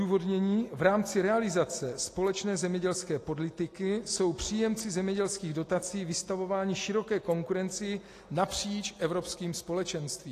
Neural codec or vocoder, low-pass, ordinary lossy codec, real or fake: none; 14.4 kHz; AAC, 48 kbps; real